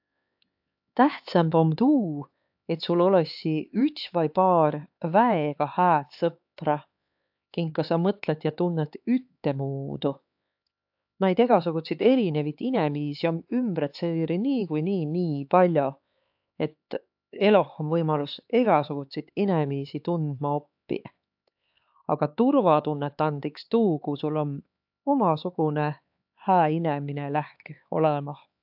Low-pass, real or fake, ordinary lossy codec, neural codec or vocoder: 5.4 kHz; fake; AAC, 48 kbps; codec, 16 kHz, 4 kbps, X-Codec, HuBERT features, trained on LibriSpeech